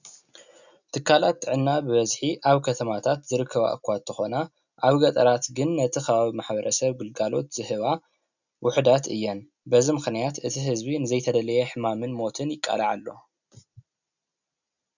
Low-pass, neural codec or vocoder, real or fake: 7.2 kHz; none; real